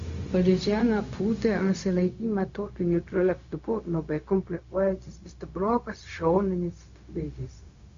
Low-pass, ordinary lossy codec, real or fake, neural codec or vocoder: 7.2 kHz; Opus, 64 kbps; fake; codec, 16 kHz, 0.4 kbps, LongCat-Audio-Codec